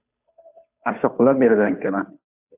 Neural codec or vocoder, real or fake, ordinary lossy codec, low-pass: codec, 16 kHz, 2 kbps, FunCodec, trained on Chinese and English, 25 frames a second; fake; AAC, 32 kbps; 3.6 kHz